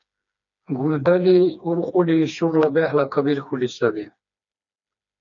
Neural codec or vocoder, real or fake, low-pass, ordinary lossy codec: codec, 16 kHz, 2 kbps, FreqCodec, smaller model; fake; 7.2 kHz; MP3, 64 kbps